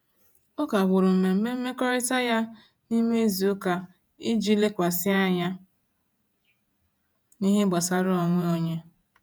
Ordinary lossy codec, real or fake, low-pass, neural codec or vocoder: none; fake; none; vocoder, 48 kHz, 128 mel bands, Vocos